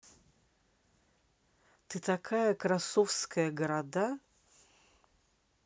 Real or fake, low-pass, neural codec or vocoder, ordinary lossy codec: real; none; none; none